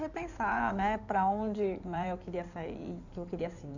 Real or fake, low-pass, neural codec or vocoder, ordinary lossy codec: fake; 7.2 kHz; codec, 16 kHz in and 24 kHz out, 2.2 kbps, FireRedTTS-2 codec; none